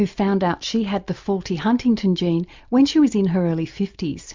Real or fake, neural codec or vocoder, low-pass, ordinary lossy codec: real; none; 7.2 kHz; MP3, 64 kbps